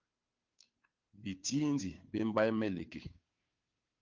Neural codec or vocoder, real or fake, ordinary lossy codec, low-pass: codec, 16 kHz, 2 kbps, FunCodec, trained on Chinese and English, 25 frames a second; fake; Opus, 24 kbps; 7.2 kHz